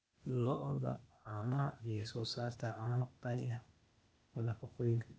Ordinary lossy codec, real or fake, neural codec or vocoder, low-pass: none; fake; codec, 16 kHz, 0.8 kbps, ZipCodec; none